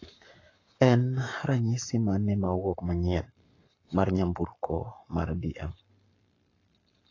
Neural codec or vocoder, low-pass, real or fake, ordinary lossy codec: codec, 44.1 kHz, 7.8 kbps, Pupu-Codec; 7.2 kHz; fake; AAC, 32 kbps